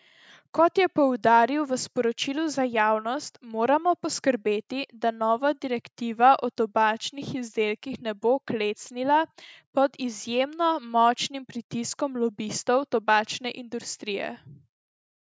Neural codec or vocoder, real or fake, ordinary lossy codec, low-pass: none; real; none; none